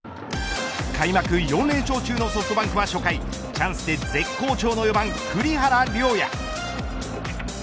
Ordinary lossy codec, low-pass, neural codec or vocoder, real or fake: none; none; none; real